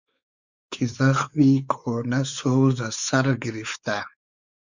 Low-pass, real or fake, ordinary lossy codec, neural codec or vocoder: 7.2 kHz; fake; Opus, 64 kbps; codec, 16 kHz, 4 kbps, X-Codec, WavLM features, trained on Multilingual LibriSpeech